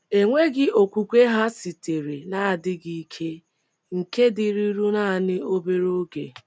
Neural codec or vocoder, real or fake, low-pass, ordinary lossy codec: none; real; none; none